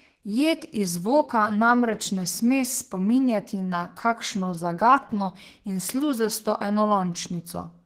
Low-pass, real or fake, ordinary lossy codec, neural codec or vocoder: 14.4 kHz; fake; Opus, 16 kbps; codec, 32 kHz, 1.9 kbps, SNAC